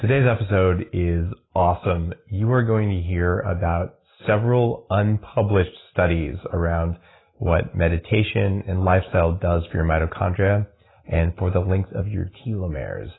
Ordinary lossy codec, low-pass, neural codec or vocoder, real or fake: AAC, 16 kbps; 7.2 kHz; none; real